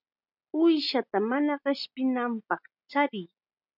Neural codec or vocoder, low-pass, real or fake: none; 5.4 kHz; real